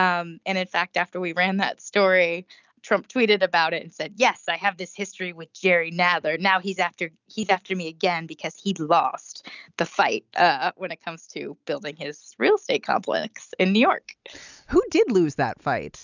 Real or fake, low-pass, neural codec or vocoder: real; 7.2 kHz; none